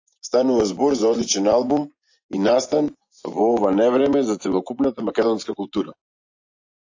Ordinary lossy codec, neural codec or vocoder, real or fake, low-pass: AAC, 48 kbps; vocoder, 24 kHz, 100 mel bands, Vocos; fake; 7.2 kHz